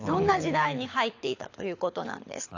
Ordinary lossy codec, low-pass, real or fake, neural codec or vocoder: AAC, 48 kbps; 7.2 kHz; fake; codec, 24 kHz, 6 kbps, HILCodec